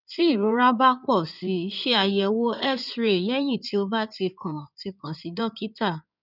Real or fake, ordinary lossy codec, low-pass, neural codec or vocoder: fake; none; 5.4 kHz; codec, 16 kHz in and 24 kHz out, 2.2 kbps, FireRedTTS-2 codec